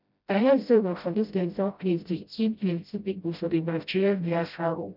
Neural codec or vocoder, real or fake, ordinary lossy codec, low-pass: codec, 16 kHz, 0.5 kbps, FreqCodec, smaller model; fake; none; 5.4 kHz